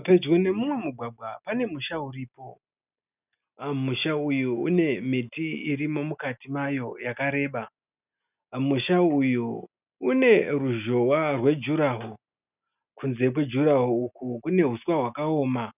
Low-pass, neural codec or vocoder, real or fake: 3.6 kHz; none; real